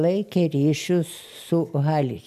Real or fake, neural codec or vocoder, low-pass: real; none; 14.4 kHz